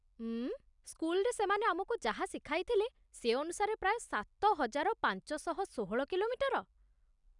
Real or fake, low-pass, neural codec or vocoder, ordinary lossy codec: real; 10.8 kHz; none; none